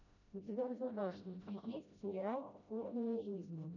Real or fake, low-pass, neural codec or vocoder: fake; 7.2 kHz; codec, 16 kHz, 0.5 kbps, FreqCodec, smaller model